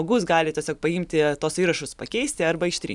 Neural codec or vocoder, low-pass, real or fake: none; 10.8 kHz; real